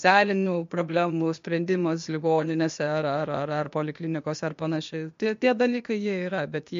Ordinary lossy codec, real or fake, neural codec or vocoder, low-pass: MP3, 48 kbps; fake; codec, 16 kHz, 0.8 kbps, ZipCodec; 7.2 kHz